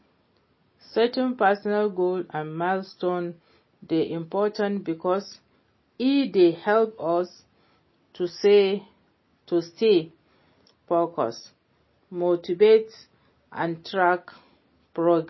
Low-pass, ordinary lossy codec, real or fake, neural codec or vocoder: 7.2 kHz; MP3, 24 kbps; real; none